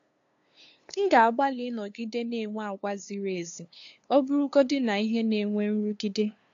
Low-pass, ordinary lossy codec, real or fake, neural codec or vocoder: 7.2 kHz; AAC, 48 kbps; fake; codec, 16 kHz, 2 kbps, FunCodec, trained on LibriTTS, 25 frames a second